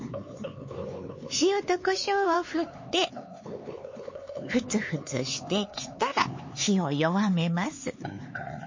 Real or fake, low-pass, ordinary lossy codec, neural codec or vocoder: fake; 7.2 kHz; MP3, 32 kbps; codec, 16 kHz, 4 kbps, X-Codec, HuBERT features, trained on LibriSpeech